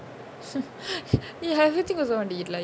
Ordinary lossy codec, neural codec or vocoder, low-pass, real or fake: none; none; none; real